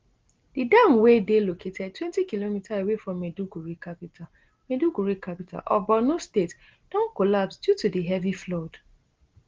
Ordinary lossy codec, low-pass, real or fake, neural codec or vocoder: Opus, 16 kbps; 7.2 kHz; real; none